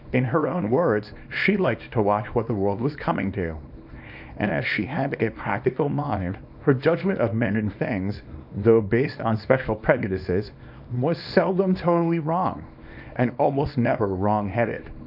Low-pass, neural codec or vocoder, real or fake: 5.4 kHz; codec, 24 kHz, 0.9 kbps, WavTokenizer, small release; fake